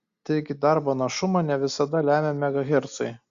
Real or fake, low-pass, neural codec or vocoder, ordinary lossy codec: real; 7.2 kHz; none; Opus, 64 kbps